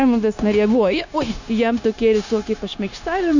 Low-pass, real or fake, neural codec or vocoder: 7.2 kHz; fake; codec, 16 kHz, 0.9 kbps, LongCat-Audio-Codec